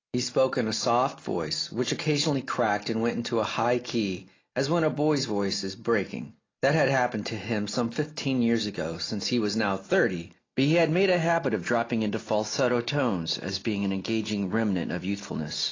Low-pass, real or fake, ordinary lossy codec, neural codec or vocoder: 7.2 kHz; real; AAC, 32 kbps; none